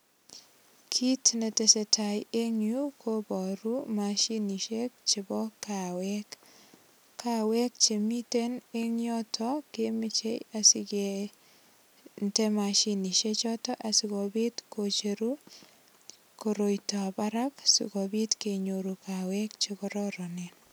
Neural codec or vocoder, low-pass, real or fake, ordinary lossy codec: none; none; real; none